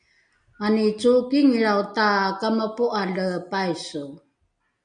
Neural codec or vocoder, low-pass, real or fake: none; 9.9 kHz; real